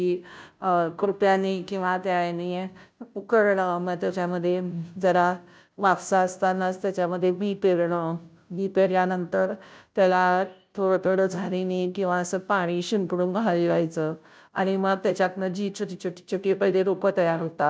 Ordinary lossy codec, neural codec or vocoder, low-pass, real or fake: none; codec, 16 kHz, 0.5 kbps, FunCodec, trained on Chinese and English, 25 frames a second; none; fake